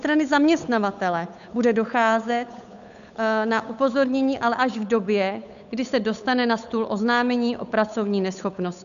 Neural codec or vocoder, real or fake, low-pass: codec, 16 kHz, 8 kbps, FunCodec, trained on Chinese and English, 25 frames a second; fake; 7.2 kHz